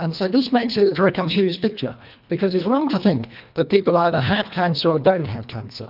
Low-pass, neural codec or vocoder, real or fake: 5.4 kHz; codec, 24 kHz, 1.5 kbps, HILCodec; fake